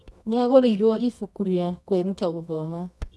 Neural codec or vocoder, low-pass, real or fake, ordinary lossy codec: codec, 24 kHz, 0.9 kbps, WavTokenizer, medium music audio release; none; fake; none